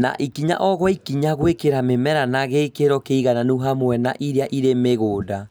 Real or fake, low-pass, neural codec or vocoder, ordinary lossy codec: real; none; none; none